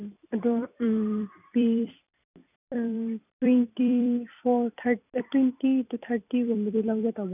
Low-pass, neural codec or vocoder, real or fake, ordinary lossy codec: 3.6 kHz; vocoder, 44.1 kHz, 128 mel bands every 256 samples, BigVGAN v2; fake; none